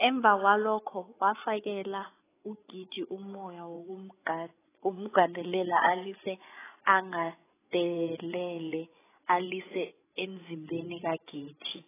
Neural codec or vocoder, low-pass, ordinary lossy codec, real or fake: codec, 16 kHz, 8 kbps, FreqCodec, larger model; 3.6 kHz; AAC, 16 kbps; fake